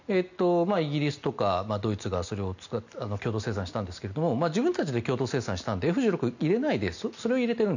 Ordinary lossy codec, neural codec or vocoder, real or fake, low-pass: none; none; real; 7.2 kHz